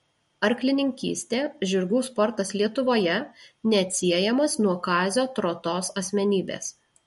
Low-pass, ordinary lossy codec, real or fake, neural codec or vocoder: 19.8 kHz; MP3, 48 kbps; real; none